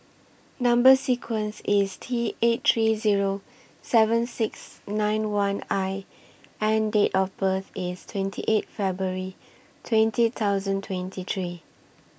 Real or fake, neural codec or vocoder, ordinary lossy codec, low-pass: real; none; none; none